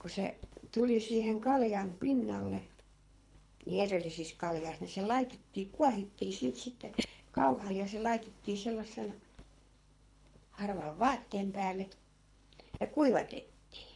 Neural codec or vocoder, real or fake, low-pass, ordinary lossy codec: codec, 24 kHz, 3 kbps, HILCodec; fake; 10.8 kHz; MP3, 96 kbps